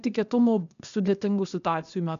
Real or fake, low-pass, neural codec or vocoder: fake; 7.2 kHz; codec, 16 kHz, 0.8 kbps, ZipCodec